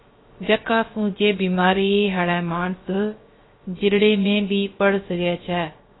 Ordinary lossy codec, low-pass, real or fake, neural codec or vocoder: AAC, 16 kbps; 7.2 kHz; fake; codec, 16 kHz, 0.3 kbps, FocalCodec